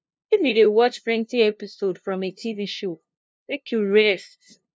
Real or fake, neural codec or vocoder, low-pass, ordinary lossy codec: fake; codec, 16 kHz, 0.5 kbps, FunCodec, trained on LibriTTS, 25 frames a second; none; none